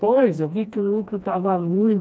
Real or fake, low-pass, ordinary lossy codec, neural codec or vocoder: fake; none; none; codec, 16 kHz, 1 kbps, FreqCodec, smaller model